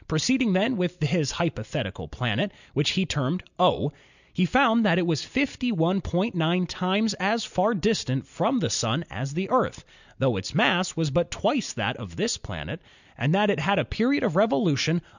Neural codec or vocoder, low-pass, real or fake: none; 7.2 kHz; real